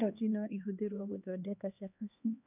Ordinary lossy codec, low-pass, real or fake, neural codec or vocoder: none; 3.6 kHz; fake; codec, 16 kHz, 2 kbps, X-Codec, HuBERT features, trained on LibriSpeech